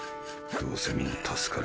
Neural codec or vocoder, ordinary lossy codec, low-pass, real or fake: none; none; none; real